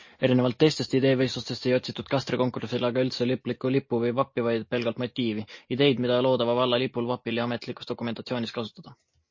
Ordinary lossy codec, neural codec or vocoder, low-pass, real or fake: MP3, 32 kbps; none; 7.2 kHz; real